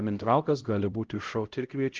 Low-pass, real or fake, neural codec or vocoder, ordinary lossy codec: 7.2 kHz; fake; codec, 16 kHz, 0.5 kbps, X-Codec, HuBERT features, trained on LibriSpeech; Opus, 16 kbps